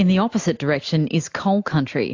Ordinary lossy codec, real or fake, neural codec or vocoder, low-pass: AAC, 48 kbps; real; none; 7.2 kHz